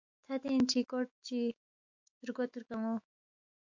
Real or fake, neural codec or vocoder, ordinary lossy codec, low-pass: fake; autoencoder, 48 kHz, 128 numbers a frame, DAC-VAE, trained on Japanese speech; MP3, 48 kbps; 7.2 kHz